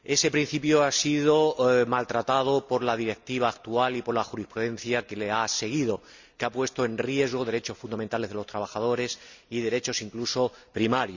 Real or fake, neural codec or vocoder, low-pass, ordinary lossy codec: real; none; 7.2 kHz; Opus, 64 kbps